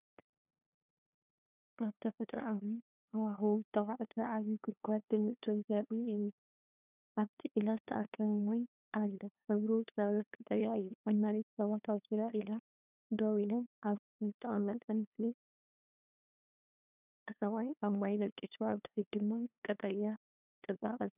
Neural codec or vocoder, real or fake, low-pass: codec, 16 kHz, 2 kbps, FunCodec, trained on LibriTTS, 25 frames a second; fake; 3.6 kHz